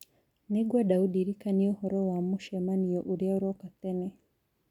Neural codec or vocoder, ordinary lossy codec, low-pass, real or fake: none; none; 19.8 kHz; real